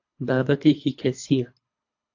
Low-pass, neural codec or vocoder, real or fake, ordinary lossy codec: 7.2 kHz; codec, 24 kHz, 3 kbps, HILCodec; fake; AAC, 48 kbps